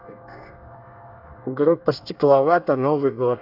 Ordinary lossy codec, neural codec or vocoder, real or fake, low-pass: none; codec, 24 kHz, 1 kbps, SNAC; fake; 5.4 kHz